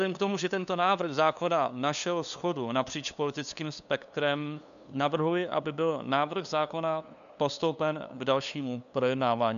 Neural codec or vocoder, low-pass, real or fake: codec, 16 kHz, 2 kbps, FunCodec, trained on LibriTTS, 25 frames a second; 7.2 kHz; fake